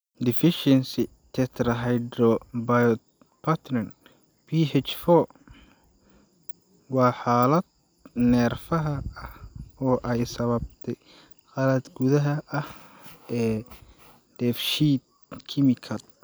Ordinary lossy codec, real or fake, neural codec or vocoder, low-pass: none; real; none; none